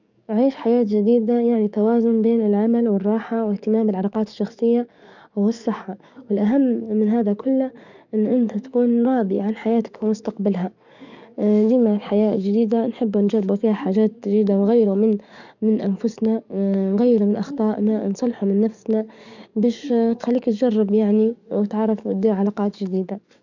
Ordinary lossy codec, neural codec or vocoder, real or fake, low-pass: none; codec, 44.1 kHz, 7.8 kbps, DAC; fake; 7.2 kHz